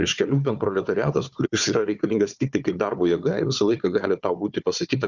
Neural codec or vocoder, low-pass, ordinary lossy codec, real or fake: codec, 16 kHz, 4 kbps, FunCodec, trained on Chinese and English, 50 frames a second; 7.2 kHz; Opus, 64 kbps; fake